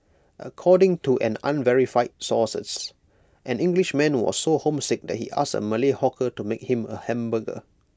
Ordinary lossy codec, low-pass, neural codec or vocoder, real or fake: none; none; none; real